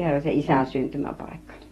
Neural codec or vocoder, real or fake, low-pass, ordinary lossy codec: vocoder, 48 kHz, 128 mel bands, Vocos; fake; 19.8 kHz; AAC, 32 kbps